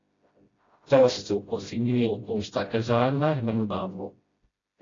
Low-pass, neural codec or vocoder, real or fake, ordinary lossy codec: 7.2 kHz; codec, 16 kHz, 0.5 kbps, FreqCodec, smaller model; fake; AAC, 32 kbps